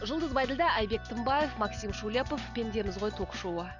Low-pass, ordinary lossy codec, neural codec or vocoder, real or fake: 7.2 kHz; none; none; real